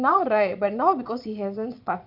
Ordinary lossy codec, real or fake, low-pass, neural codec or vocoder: none; fake; 5.4 kHz; vocoder, 22.05 kHz, 80 mel bands, Vocos